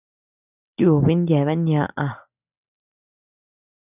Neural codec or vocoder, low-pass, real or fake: none; 3.6 kHz; real